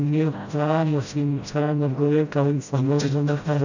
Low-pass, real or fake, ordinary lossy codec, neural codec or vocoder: 7.2 kHz; fake; none; codec, 16 kHz, 0.5 kbps, FreqCodec, smaller model